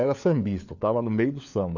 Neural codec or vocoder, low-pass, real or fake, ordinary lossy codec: codec, 16 kHz, 4 kbps, FunCodec, trained on LibriTTS, 50 frames a second; 7.2 kHz; fake; none